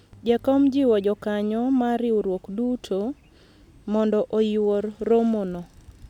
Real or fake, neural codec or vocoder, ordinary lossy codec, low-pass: real; none; none; 19.8 kHz